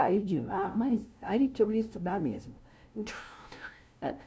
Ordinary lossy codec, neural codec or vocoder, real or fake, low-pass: none; codec, 16 kHz, 0.5 kbps, FunCodec, trained on LibriTTS, 25 frames a second; fake; none